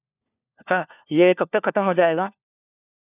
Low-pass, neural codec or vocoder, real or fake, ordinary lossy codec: 3.6 kHz; codec, 16 kHz, 1 kbps, FunCodec, trained on LibriTTS, 50 frames a second; fake; none